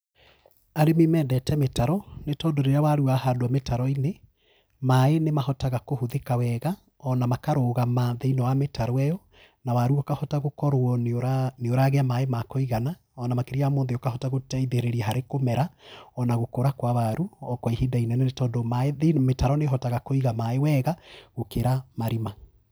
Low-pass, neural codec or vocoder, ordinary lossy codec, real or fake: none; none; none; real